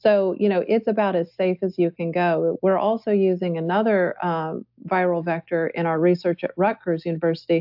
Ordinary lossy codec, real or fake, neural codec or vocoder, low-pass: AAC, 48 kbps; real; none; 5.4 kHz